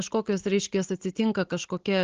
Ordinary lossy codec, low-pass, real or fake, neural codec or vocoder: Opus, 16 kbps; 7.2 kHz; real; none